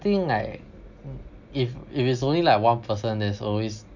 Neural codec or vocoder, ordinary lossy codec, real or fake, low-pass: none; none; real; 7.2 kHz